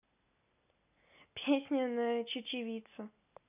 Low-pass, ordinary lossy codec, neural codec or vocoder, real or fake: 3.6 kHz; none; none; real